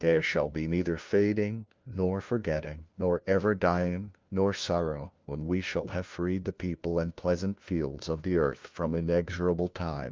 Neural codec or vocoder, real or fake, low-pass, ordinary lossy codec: codec, 16 kHz, 1 kbps, FunCodec, trained on LibriTTS, 50 frames a second; fake; 7.2 kHz; Opus, 32 kbps